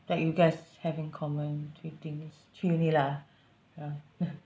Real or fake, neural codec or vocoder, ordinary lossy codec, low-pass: real; none; none; none